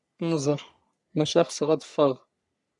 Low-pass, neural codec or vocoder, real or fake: 10.8 kHz; codec, 44.1 kHz, 3.4 kbps, Pupu-Codec; fake